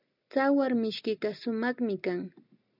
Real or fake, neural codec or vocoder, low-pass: real; none; 5.4 kHz